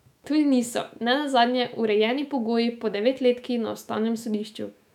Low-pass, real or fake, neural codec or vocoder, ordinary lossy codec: 19.8 kHz; fake; autoencoder, 48 kHz, 128 numbers a frame, DAC-VAE, trained on Japanese speech; none